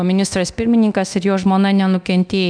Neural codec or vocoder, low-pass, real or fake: codec, 24 kHz, 0.9 kbps, DualCodec; 9.9 kHz; fake